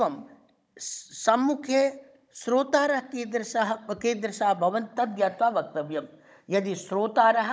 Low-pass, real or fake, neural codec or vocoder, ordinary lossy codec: none; fake; codec, 16 kHz, 16 kbps, FunCodec, trained on Chinese and English, 50 frames a second; none